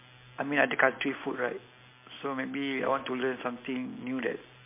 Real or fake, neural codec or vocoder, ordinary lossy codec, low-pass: real; none; MP3, 24 kbps; 3.6 kHz